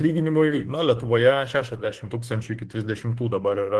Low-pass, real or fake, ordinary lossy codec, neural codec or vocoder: 10.8 kHz; fake; Opus, 16 kbps; autoencoder, 48 kHz, 32 numbers a frame, DAC-VAE, trained on Japanese speech